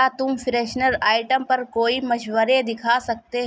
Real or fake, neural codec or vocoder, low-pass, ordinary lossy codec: real; none; none; none